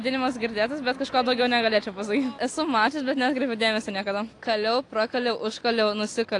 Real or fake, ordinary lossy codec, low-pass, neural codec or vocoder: real; AAC, 48 kbps; 10.8 kHz; none